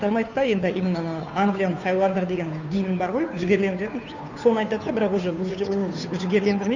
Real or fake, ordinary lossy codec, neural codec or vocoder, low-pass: fake; none; codec, 16 kHz, 2 kbps, FunCodec, trained on Chinese and English, 25 frames a second; 7.2 kHz